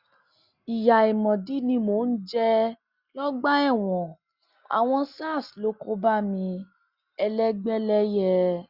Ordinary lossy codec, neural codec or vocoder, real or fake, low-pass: Opus, 64 kbps; none; real; 5.4 kHz